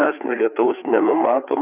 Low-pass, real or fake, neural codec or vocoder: 3.6 kHz; fake; codec, 16 kHz, 4 kbps, FreqCodec, larger model